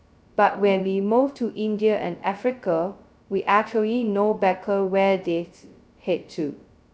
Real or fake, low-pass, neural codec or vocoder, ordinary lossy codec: fake; none; codec, 16 kHz, 0.2 kbps, FocalCodec; none